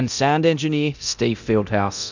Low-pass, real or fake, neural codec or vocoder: 7.2 kHz; fake; codec, 24 kHz, 0.9 kbps, DualCodec